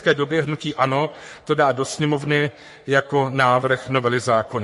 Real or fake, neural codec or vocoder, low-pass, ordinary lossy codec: fake; codec, 44.1 kHz, 3.4 kbps, Pupu-Codec; 14.4 kHz; MP3, 48 kbps